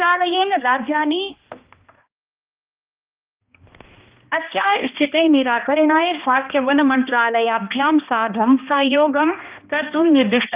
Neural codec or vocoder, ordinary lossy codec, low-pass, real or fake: codec, 16 kHz, 1 kbps, X-Codec, HuBERT features, trained on balanced general audio; Opus, 24 kbps; 3.6 kHz; fake